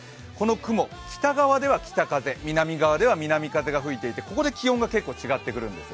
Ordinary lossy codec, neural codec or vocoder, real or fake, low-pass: none; none; real; none